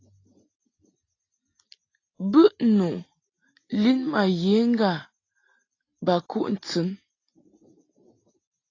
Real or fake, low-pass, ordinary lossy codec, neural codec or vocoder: real; 7.2 kHz; AAC, 32 kbps; none